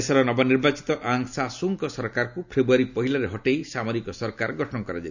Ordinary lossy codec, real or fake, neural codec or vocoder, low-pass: none; real; none; 7.2 kHz